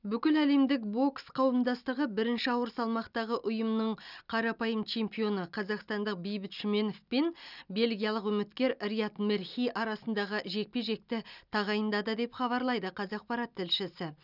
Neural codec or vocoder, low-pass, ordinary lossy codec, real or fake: none; 5.4 kHz; none; real